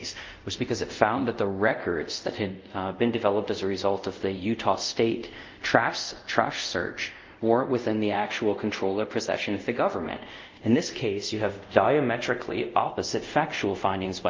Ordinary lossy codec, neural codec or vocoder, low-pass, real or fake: Opus, 32 kbps; codec, 24 kHz, 0.5 kbps, DualCodec; 7.2 kHz; fake